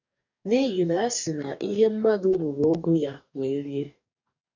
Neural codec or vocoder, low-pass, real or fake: codec, 44.1 kHz, 2.6 kbps, DAC; 7.2 kHz; fake